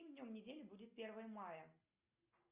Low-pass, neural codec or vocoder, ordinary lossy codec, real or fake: 3.6 kHz; none; Opus, 64 kbps; real